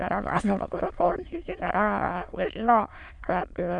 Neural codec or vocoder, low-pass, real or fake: autoencoder, 22.05 kHz, a latent of 192 numbers a frame, VITS, trained on many speakers; 9.9 kHz; fake